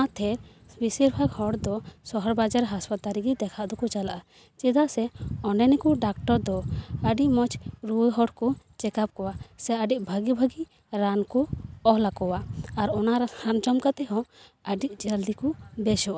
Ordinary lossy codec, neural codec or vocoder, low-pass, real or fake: none; none; none; real